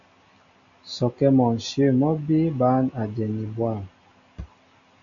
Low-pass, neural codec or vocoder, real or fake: 7.2 kHz; none; real